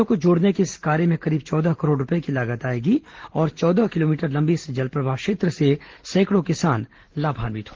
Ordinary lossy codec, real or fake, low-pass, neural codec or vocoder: Opus, 16 kbps; real; 7.2 kHz; none